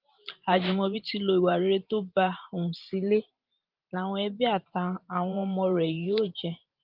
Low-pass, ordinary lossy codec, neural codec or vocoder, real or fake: 5.4 kHz; Opus, 32 kbps; vocoder, 44.1 kHz, 128 mel bands every 512 samples, BigVGAN v2; fake